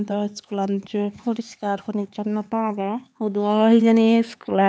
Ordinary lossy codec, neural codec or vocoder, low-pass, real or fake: none; codec, 16 kHz, 4 kbps, X-Codec, HuBERT features, trained on LibriSpeech; none; fake